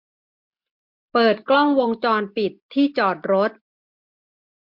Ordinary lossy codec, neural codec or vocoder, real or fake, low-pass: MP3, 48 kbps; none; real; 5.4 kHz